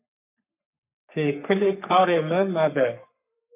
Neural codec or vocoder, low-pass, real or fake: codec, 44.1 kHz, 3.4 kbps, Pupu-Codec; 3.6 kHz; fake